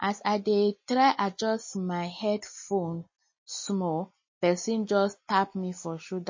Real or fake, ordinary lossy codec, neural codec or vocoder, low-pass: real; MP3, 32 kbps; none; 7.2 kHz